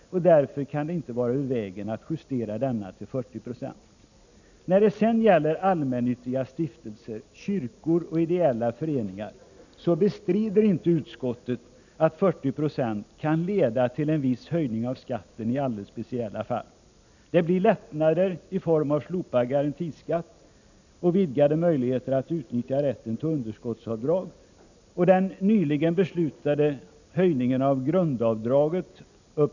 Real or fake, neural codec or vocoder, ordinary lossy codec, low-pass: real; none; none; 7.2 kHz